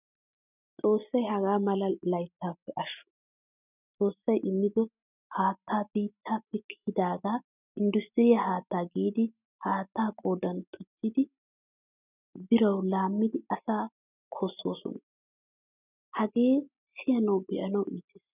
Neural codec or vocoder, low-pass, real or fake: none; 3.6 kHz; real